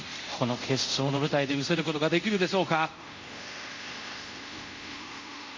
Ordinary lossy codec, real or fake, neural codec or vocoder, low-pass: MP3, 48 kbps; fake; codec, 24 kHz, 0.5 kbps, DualCodec; 7.2 kHz